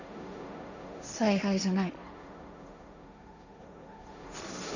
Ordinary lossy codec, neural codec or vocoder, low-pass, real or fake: none; codec, 16 kHz, 1.1 kbps, Voila-Tokenizer; 7.2 kHz; fake